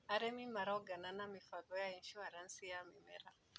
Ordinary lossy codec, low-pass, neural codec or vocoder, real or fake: none; none; none; real